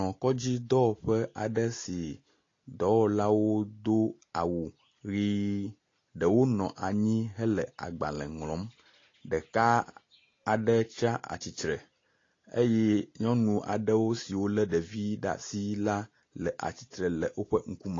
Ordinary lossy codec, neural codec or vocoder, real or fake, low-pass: AAC, 32 kbps; none; real; 7.2 kHz